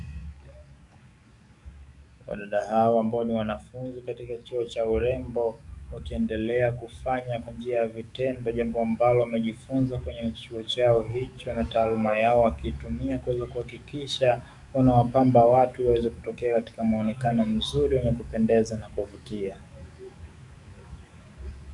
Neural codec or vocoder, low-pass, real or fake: codec, 44.1 kHz, 7.8 kbps, DAC; 10.8 kHz; fake